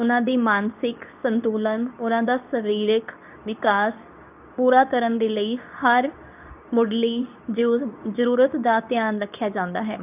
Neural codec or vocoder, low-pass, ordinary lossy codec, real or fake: codec, 24 kHz, 0.9 kbps, WavTokenizer, medium speech release version 2; 3.6 kHz; none; fake